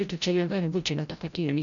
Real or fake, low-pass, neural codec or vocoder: fake; 7.2 kHz; codec, 16 kHz, 0.5 kbps, FreqCodec, larger model